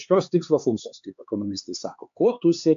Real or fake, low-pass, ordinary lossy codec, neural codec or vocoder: fake; 7.2 kHz; AAC, 64 kbps; codec, 16 kHz, 4 kbps, X-Codec, HuBERT features, trained on LibriSpeech